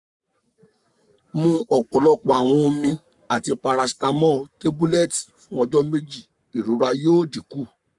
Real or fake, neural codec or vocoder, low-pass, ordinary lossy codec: fake; codec, 44.1 kHz, 7.8 kbps, Pupu-Codec; 10.8 kHz; none